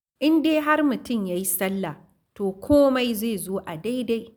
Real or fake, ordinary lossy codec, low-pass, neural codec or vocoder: real; none; none; none